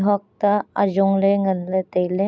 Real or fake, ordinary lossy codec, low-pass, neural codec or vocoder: real; none; none; none